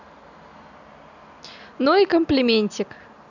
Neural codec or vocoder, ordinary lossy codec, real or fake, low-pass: none; none; real; 7.2 kHz